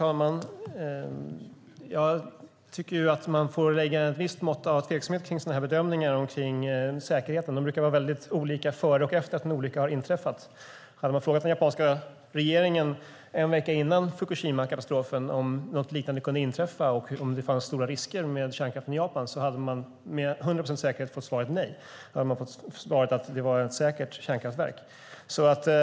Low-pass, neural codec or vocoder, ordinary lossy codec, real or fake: none; none; none; real